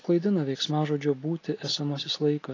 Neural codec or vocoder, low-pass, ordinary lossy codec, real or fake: none; 7.2 kHz; AAC, 32 kbps; real